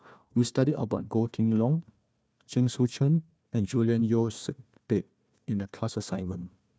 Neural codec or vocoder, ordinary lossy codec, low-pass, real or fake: codec, 16 kHz, 1 kbps, FunCodec, trained on Chinese and English, 50 frames a second; none; none; fake